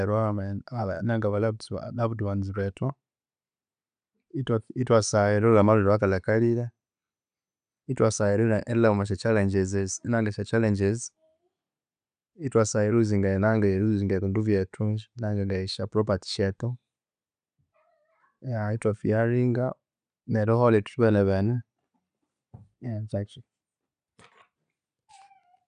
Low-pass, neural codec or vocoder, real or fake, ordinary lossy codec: 9.9 kHz; none; real; none